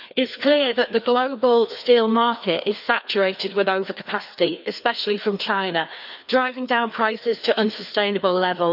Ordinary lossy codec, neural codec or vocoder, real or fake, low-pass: none; codec, 16 kHz, 2 kbps, FreqCodec, larger model; fake; 5.4 kHz